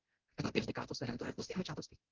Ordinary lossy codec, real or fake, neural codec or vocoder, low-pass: Opus, 24 kbps; fake; codec, 24 kHz, 0.9 kbps, DualCodec; 7.2 kHz